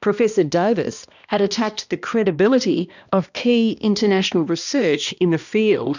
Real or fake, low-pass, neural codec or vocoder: fake; 7.2 kHz; codec, 16 kHz, 1 kbps, X-Codec, HuBERT features, trained on balanced general audio